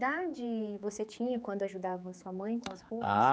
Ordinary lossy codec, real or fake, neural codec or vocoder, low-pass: none; fake; codec, 16 kHz, 4 kbps, X-Codec, HuBERT features, trained on general audio; none